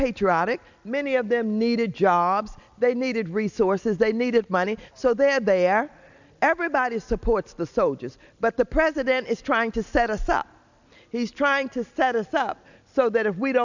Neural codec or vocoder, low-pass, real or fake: none; 7.2 kHz; real